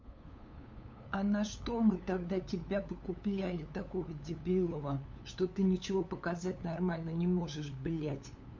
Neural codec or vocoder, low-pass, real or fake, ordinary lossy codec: codec, 16 kHz, 8 kbps, FunCodec, trained on LibriTTS, 25 frames a second; 7.2 kHz; fake; MP3, 48 kbps